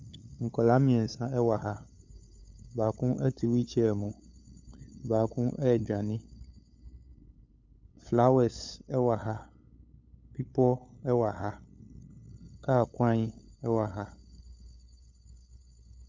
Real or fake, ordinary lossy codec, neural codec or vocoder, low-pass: fake; MP3, 64 kbps; codec, 16 kHz, 8 kbps, FunCodec, trained on LibriTTS, 25 frames a second; 7.2 kHz